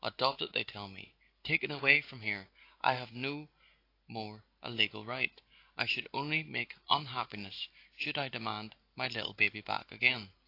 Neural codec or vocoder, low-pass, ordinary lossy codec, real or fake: none; 5.4 kHz; AAC, 32 kbps; real